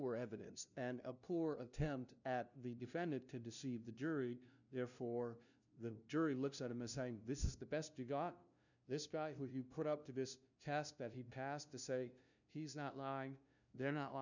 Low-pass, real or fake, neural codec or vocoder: 7.2 kHz; fake; codec, 16 kHz, 0.5 kbps, FunCodec, trained on LibriTTS, 25 frames a second